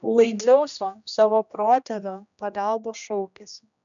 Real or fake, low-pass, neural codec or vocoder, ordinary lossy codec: fake; 7.2 kHz; codec, 16 kHz, 1 kbps, X-Codec, HuBERT features, trained on general audio; MP3, 96 kbps